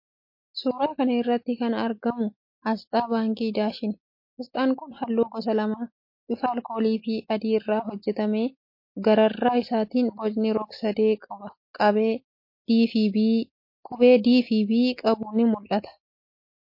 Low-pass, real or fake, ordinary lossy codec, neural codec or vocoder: 5.4 kHz; real; MP3, 32 kbps; none